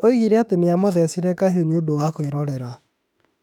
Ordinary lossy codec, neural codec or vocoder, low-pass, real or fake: none; autoencoder, 48 kHz, 32 numbers a frame, DAC-VAE, trained on Japanese speech; 19.8 kHz; fake